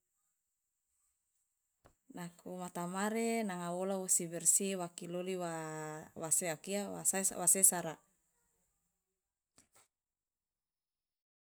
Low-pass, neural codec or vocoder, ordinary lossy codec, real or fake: none; none; none; real